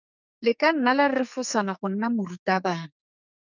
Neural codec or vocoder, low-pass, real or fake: codec, 44.1 kHz, 2.6 kbps, SNAC; 7.2 kHz; fake